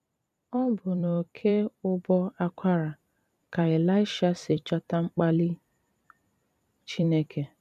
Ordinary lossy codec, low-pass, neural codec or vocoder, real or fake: none; 14.4 kHz; vocoder, 44.1 kHz, 128 mel bands every 256 samples, BigVGAN v2; fake